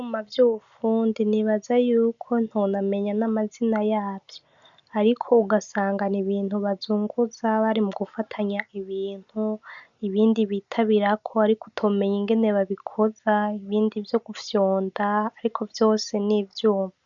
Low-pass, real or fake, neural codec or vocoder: 7.2 kHz; real; none